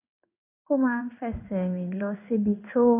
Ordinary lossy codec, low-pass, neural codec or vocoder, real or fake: none; 3.6 kHz; codec, 16 kHz in and 24 kHz out, 1 kbps, XY-Tokenizer; fake